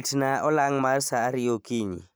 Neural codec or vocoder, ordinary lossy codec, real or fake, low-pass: vocoder, 44.1 kHz, 128 mel bands every 512 samples, BigVGAN v2; none; fake; none